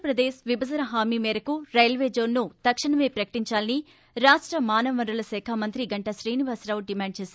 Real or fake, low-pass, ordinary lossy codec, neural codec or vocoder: real; none; none; none